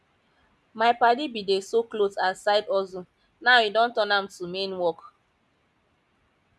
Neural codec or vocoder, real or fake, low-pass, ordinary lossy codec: none; real; none; none